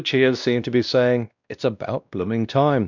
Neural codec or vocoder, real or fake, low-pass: codec, 16 kHz, 1 kbps, X-Codec, WavLM features, trained on Multilingual LibriSpeech; fake; 7.2 kHz